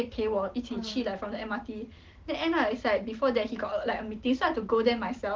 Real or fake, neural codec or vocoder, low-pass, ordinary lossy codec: real; none; 7.2 kHz; Opus, 16 kbps